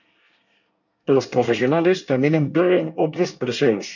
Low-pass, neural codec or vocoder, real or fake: 7.2 kHz; codec, 24 kHz, 1 kbps, SNAC; fake